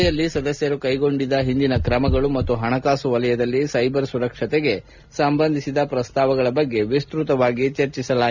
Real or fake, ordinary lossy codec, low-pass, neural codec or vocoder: real; none; 7.2 kHz; none